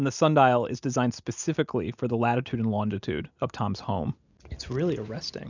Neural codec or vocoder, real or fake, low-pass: none; real; 7.2 kHz